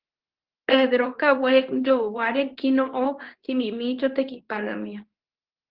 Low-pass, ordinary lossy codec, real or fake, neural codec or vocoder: 5.4 kHz; Opus, 16 kbps; fake; codec, 24 kHz, 0.9 kbps, WavTokenizer, medium speech release version 2